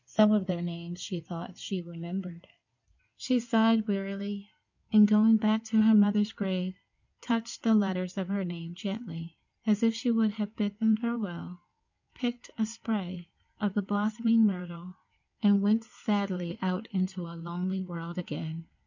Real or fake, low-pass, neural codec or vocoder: fake; 7.2 kHz; codec, 16 kHz in and 24 kHz out, 2.2 kbps, FireRedTTS-2 codec